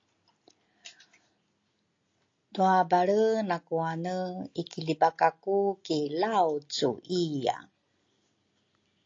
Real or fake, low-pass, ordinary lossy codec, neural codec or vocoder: real; 7.2 kHz; AAC, 48 kbps; none